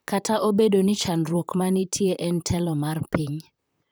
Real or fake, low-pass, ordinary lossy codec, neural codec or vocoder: fake; none; none; vocoder, 44.1 kHz, 128 mel bands, Pupu-Vocoder